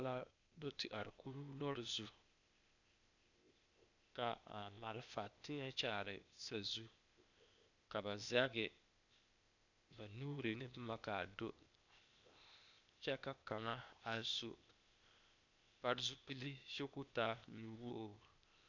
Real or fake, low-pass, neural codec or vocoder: fake; 7.2 kHz; codec, 16 kHz, 0.8 kbps, ZipCodec